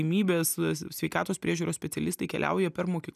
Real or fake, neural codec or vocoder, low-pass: real; none; 14.4 kHz